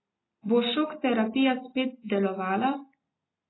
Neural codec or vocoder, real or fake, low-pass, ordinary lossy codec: none; real; 7.2 kHz; AAC, 16 kbps